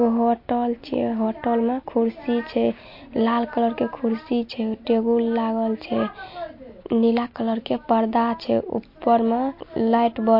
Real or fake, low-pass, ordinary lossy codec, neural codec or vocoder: real; 5.4 kHz; MP3, 32 kbps; none